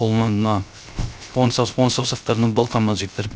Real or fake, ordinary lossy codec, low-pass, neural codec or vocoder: fake; none; none; codec, 16 kHz, 0.3 kbps, FocalCodec